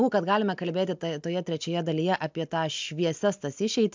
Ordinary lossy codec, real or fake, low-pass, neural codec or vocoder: MP3, 64 kbps; real; 7.2 kHz; none